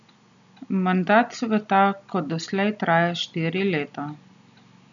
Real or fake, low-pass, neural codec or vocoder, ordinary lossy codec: real; 7.2 kHz; none; none